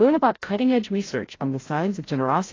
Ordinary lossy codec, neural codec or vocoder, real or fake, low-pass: AAC, 32 kbps; codec, 16 kHz, 0.5 kbps, FreqCodec, larger model; fake; 7.2 kHz